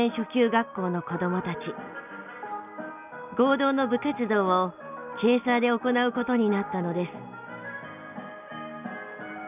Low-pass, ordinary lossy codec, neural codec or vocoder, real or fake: 3.6 kHz; none; none; real